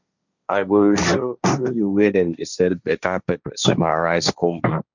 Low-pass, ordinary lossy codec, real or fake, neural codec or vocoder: 7.2 kHz; none; fake; codec, 16 kHz, 1.1 kbps, Voila-Tokenizer